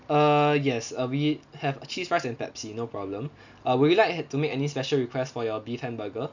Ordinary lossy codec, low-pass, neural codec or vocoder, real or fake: none; 7.2 kHz; none; real